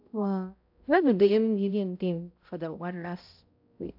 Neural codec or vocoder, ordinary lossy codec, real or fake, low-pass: codec, 16 kHz, 0.5 kbps, X-Codec, HuBERT features, trained on balanced general audio; AAC, 48 kbps; fake; 5.4 kHz